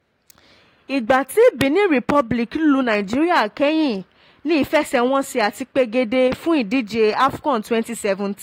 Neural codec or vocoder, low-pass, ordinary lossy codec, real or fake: none; 19.8 kHz; AAC, 48 kbps; real